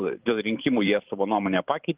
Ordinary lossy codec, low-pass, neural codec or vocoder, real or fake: Opus, 32 kbps; 3.6 kHz; vocoder, 44.1 kHz, 128 mel bands every 512 samples, BigVGAN v2; fake